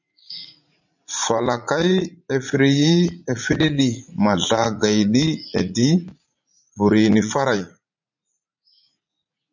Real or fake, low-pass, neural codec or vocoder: fake; 7.2 kHz; vocoder, 44.1 kHz, 80 mel bands, Vocos